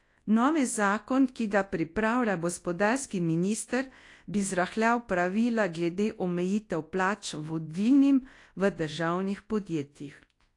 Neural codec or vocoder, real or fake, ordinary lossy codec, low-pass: codec, 24 kHz, 0.9 kbps, WavTokenizer, large speech release; fake; AAC, 48 kbps; 10.8 kHz